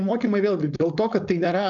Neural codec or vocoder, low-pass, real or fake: codec, 16 kHz, 4.8 kbps, FACodec; 7.2 kHz; fake